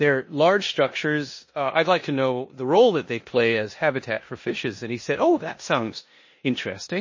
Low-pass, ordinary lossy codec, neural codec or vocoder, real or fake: 7.2 kHz; MP3, 32 kbps; codec, 16 kHz in and 24 kHz out, 0.9 kbps, LongCat-Audio-Codec, four codebook decoder; fake